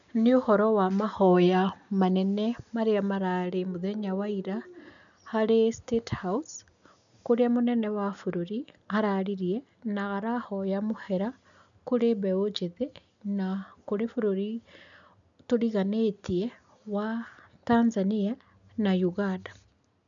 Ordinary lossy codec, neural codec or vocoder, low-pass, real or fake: none; none; 7.2 kHz; real